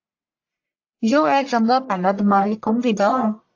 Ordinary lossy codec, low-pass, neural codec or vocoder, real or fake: AAC, 48 kbps; 7.2 kHz; codec, 44.1 kHz, 1.7 kbps, Pupu-Codec; fake